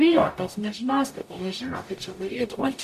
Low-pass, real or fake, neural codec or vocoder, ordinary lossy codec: 14.4 kHz; fake; codec, 44.1 kHz, 0.9 kbps, DAC; MP3, 64 kbps